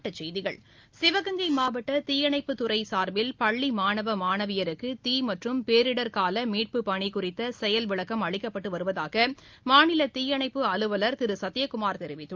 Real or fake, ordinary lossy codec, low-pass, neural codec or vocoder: real; Opus, 24 kbps; 7.2 kHz; none